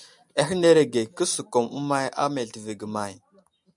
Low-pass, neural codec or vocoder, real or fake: 10.8 kHz; none; real